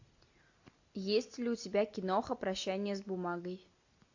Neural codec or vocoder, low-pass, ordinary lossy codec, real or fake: none; 7.2 kHz; AAC, 48 kbps; real